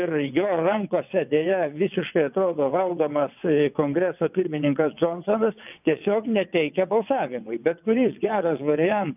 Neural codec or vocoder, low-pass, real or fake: vocoder, 22.05 kHz, 80 mel bands, WaveNeXt; 3.6 kHz; fake